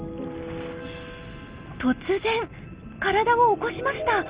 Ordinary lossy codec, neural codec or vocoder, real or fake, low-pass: Opus, 32 kbps; none; real; 3.6 kHz